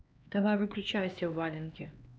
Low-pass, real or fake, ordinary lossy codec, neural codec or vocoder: none; fake; none; codec, 16 kHz, 2 kbps, X-Codec, HuBERT features, trained on LibriSpeech